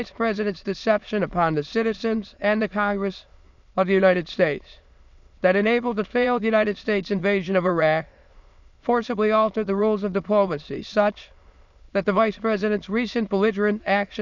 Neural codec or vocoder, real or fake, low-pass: autoencoder, 22.05 kHz, a latent of 192 numbers a frame, VITS, trained on many speakers; fake; 7.2 kHz